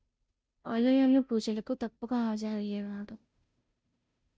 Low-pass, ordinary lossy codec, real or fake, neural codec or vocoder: none; none; fake; codec, 16 kHz, 0.5 kbps, FunCodec, trained on Chinese and English, 25 frames a second